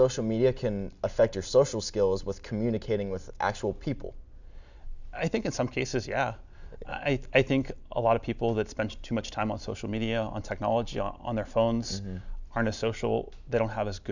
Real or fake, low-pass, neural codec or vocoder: real; 7.2 kHz; none